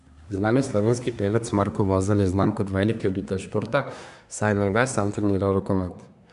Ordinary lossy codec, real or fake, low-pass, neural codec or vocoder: none; fake; 10.8 kHz; codec, 24 kHz, 1 kbps, SNAC